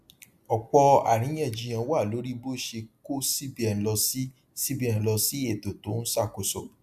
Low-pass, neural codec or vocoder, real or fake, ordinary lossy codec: 14.4 kHz; none; real; AAC, 96 kbps